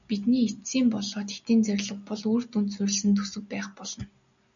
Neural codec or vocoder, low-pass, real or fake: none; 7.2 kHz; real